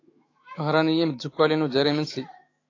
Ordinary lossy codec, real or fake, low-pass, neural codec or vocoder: AAC, 32 kbps; fake; 7.2 kHz; autoencoder, 48 kHz, 128 numbers a frame, DAC-VAE, trained on Japanese speech